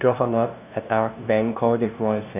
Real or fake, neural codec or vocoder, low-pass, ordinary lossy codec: fake; codec, 16 kHz, 0.5 kbps, FunCodec, trained on LibriTTS, 25 frames a second; 3.6 kHz; none